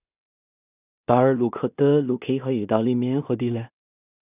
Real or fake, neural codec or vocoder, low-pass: fake; codec, 16 kHz in and 24 kHz out, 0.4 kbps, LongCat-Audio-Codec, two codebook decoder; 3.6 kHz